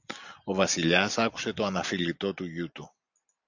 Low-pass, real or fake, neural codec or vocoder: 7.2 kHz; real; none